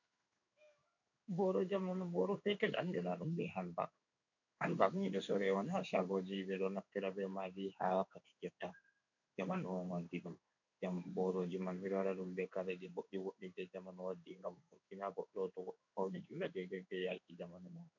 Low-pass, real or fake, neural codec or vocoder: 7.2 kHz; fake; codec, 16 kHz in and 24 kHz out, 1 kbps, XY-Tokenizer